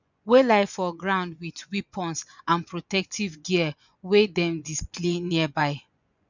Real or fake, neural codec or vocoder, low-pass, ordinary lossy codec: fake; vocoder, 24 kHz, 100 mel bands, Vocos; 7.2 kHz; none